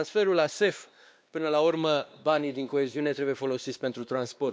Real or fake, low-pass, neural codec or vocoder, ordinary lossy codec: fake; none; codec, 16 kHz, 2 kbps, X-Codec, WavLM features, trained on Multilingual LibriSpeech; none